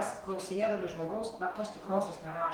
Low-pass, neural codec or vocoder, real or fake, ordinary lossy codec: 19.8 kHz; codec, 44.1 kHz, 2.6 kbps, DAC; fake; Opus, 24 kbps